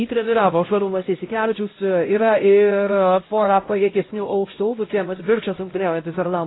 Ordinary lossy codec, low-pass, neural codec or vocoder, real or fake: AAC, 16 kbps; 7.2 kHz; codec, 16 kHz, 0.5 kbps, X-Codec, HuBERT features, trained on LibriSpeech; fake